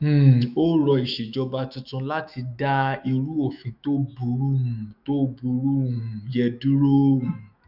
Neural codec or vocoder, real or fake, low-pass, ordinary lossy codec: autoencoder, 48 kHz, 128 numbers a frame, DAC-VAE, trained on Japanese speech; fake; 5.4 kHz; none